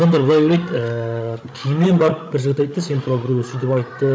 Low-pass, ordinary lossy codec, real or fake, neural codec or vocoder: none; none; fake; codec, 16 kHz, 16 kbps, FreqCodec, larger model